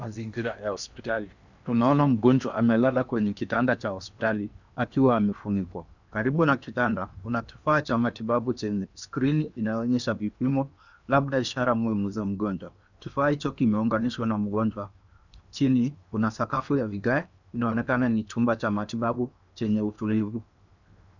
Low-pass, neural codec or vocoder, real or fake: 7.2 kHz; codec, 16 kHz in and 24 kHz out, 0.8 kbps, FocalCodec, streaming, 65536 codes; fake